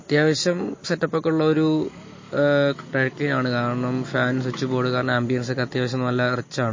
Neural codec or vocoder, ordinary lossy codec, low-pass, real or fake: none; MP3, 32 kbps; 7.2 kHz; real